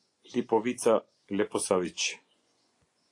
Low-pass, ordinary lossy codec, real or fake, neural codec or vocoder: 10.8 kHz; AAC, 48 kbps; fake; vocoder, 24 kHz, 100 mel bands, Vocos